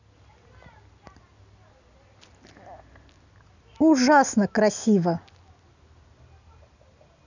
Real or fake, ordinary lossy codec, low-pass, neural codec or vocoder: real; none; 7.2 kHz; none